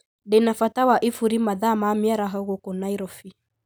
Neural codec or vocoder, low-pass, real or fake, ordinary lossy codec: none; none; real; none